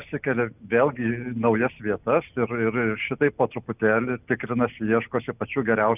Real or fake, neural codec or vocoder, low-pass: real; none; 3.6 kHz